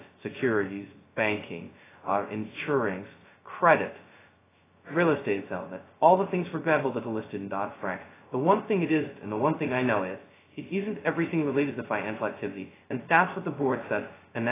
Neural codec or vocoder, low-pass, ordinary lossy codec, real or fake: codec, 16 kHz, 0.2 kbps, FocalCodec; 3.6 kHz; AAC, 16 kbps; fake